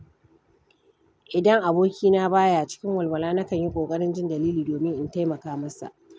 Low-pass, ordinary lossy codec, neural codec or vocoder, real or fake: none; none; none; real